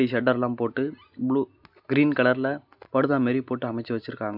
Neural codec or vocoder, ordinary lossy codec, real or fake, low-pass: none; none; real; 5.4 kHz